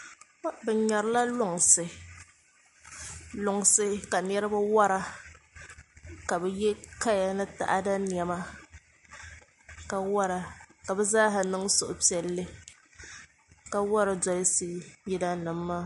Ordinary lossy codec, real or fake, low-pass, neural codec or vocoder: MP3, 48 kbps; real; 14.4 kHz; none